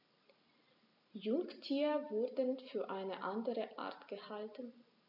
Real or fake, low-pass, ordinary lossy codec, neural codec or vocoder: real; 5.4 kHz; none; none